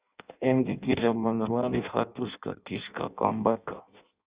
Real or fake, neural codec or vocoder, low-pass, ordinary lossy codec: fake; codec, 16 kHz in and 24 kHz out, 0.6 kbps, FireRedTTS-2 codec; 3.6 kHz; Opus, 64 kbps